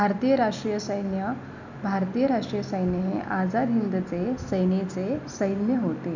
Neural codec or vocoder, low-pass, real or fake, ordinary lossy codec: none; 7.2 kHz; real; none